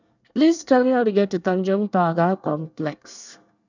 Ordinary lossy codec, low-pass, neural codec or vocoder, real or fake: none; 7.2 kHz; codec, 24 kHz, 1 kbps, SNAC; fake